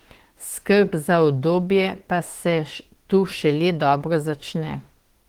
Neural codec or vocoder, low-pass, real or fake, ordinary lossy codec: autoencoder, 48 kHz, 32 numbers a frame, DAC-VAE, trained on Japanese speech; 19.8 kHz; fake; Opus, 16 kbps